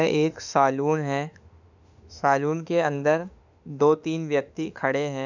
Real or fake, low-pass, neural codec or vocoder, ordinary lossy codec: fake; 7.2 kHz; autoencoder, 48 kHz, 32 numbers a frame, DAC-VAE, trained on Japanese speech; none